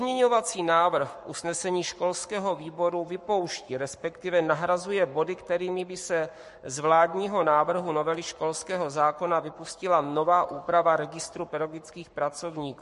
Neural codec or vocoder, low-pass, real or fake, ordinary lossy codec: codec, 44.1 kHz, 7.8 kbps, Pupu-Codec; 14.4 kHz; fake; MP3, 48 kbps